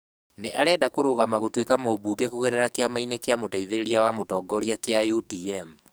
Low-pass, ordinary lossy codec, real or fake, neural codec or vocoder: none; none; fake; codec, 44.1 kHz, 2.6 kbps, SNAC